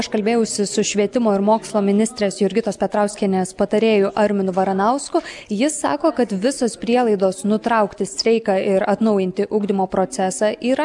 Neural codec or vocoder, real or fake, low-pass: none; real; 10.8 kHz